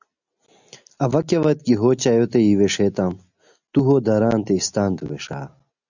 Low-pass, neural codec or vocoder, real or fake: 7.2 kHz; none; real